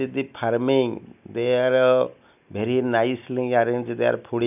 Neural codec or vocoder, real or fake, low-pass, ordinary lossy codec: none; real; 3.6 kHz; none